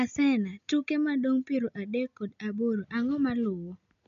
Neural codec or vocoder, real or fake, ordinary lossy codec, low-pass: none; real; none; 7.2 kHz